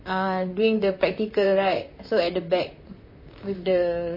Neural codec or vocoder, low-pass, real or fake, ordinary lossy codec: vocoder, 44.1 kHz, 128 mel bands, Pupu-Vocoder; 5.4 kHz; fake; MP3, 24 kbps